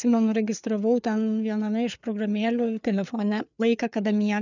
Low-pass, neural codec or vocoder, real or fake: 7.2 kHz; codec, 16 kHz, 4 kbps, FreqCodec, larger model; fake